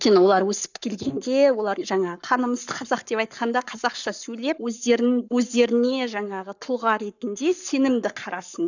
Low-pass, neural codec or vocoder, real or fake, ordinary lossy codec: 7.2 kHz; codec, 44.1 kHz, 7.8 kbps, Pupu-Codec; fake; none